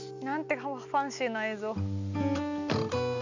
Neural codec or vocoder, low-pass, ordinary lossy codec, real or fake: none; 7.2 kHz; none; real